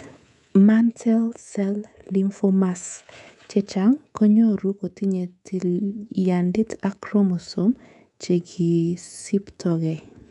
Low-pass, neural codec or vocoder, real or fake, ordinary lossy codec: 10.8 kHz; codec, 24 kHz, 3.1 kbps, DualCodec; fake; none